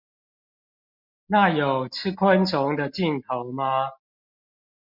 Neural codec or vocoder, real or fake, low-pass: none; real; 5.4 kHz